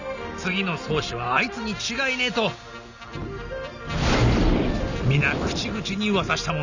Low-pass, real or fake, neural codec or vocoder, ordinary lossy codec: 7.2 kHz; real; none; none